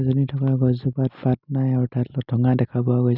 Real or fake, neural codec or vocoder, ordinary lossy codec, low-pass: real; none; none; 5.4 kHz